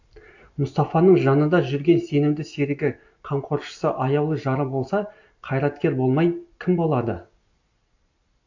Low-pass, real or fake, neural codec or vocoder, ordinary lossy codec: 7.2 kHz; real; none; AAC, 48 kbps